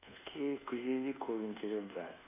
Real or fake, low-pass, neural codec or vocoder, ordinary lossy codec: fake; 3.6 kHz; codec, 24 kHz, 1.2 kbps, DualCodec; none